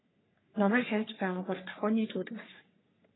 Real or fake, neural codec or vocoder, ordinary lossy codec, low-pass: fake; codec, 44.1 kHz, 3.4 kbps, Pupu-Codec; AAC, 16 kbps; 7.2 kHz